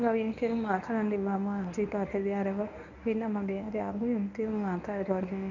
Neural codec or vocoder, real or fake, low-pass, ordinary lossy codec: codec, 24 kHz, 0.9 kbps, WavTokenizer, medium speech release version 1; fake; 7.2 kHz; none